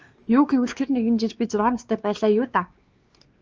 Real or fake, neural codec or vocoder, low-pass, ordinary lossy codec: fake; codec, 16 kHz, 2 kbps, X-Codec, WavLM features, trained on Multilingual LibriSpeech; 7.2 kHz; Opus, 24 kbps